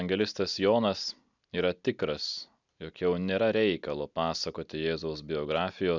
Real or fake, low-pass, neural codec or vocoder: real; 7.2 kHz; none